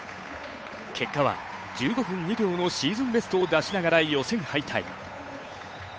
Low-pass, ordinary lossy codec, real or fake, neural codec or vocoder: none; none; fake; codec, 16 kHz, 8 kbps, FunCodec, trained on Chinese and English, 25 frames a second